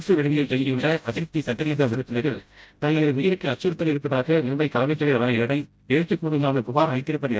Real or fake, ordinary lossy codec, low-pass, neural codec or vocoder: fake; none; none; codec, 16 kHz, 0.5 kbps, FreqCodec, smaller model